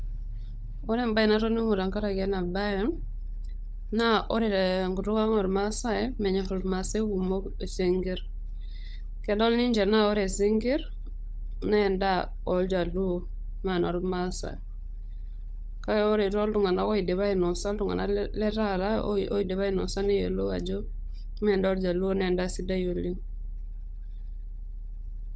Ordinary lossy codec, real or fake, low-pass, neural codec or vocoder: none; fake; none; codec, 16 kHz, 16 kbps, FunCodec, trained on LibriTTS, 50 frames a second